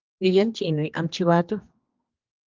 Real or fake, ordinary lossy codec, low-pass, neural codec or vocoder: fake; Opus, 24 kbps; 7.2 kHz; codec, 16 kHz, 2 kbps, X-Codec, HuBERT features, trained on general audio